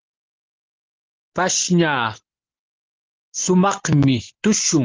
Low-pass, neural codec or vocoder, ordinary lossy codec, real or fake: 7.2 kHz; none; Opus, 16 kbps; real